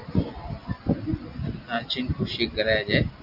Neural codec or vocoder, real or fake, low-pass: none; real; 5.4 kHz